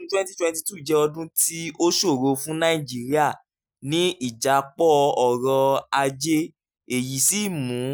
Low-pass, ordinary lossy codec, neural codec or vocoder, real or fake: none; none; none; real